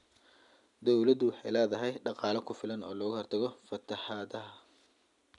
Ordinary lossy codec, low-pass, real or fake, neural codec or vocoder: none; 10.8 kHz; real; none